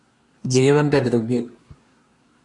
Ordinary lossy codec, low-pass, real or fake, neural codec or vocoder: MP3, 48 kbps; 10.8 kHz; fake; codec, 24 kHz, 1 kbps, SNAC